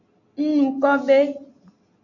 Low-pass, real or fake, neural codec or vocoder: 7.2 kHz; real; none